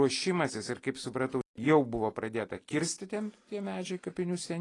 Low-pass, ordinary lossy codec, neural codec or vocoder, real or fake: 10.8 kHz; AAC, 32 kbps; none; real